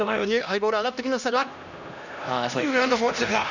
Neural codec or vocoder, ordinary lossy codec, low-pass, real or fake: codec, 16 kHz, 1 kbps, X-Codec, HuBERT features, trained on LibriSpeech; none; 7.2 kHz; fake